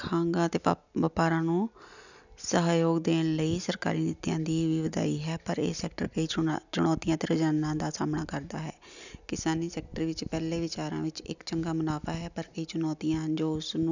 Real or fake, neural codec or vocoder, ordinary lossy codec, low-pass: real; none; none; 7.2 kHz